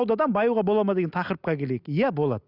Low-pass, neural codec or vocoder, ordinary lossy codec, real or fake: 5.4 kHz; none; Opus, 64 kbps; real